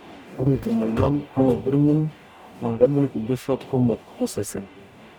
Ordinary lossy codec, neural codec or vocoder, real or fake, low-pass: none; codec, 44.1 kHz, 0.9 kbps, DAC; fake; 19.8 kHz